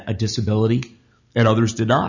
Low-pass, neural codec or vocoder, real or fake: 7.2 kHz; none; real